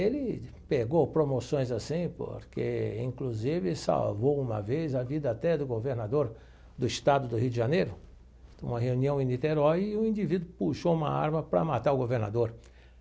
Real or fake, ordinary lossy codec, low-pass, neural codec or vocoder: real; none; none; none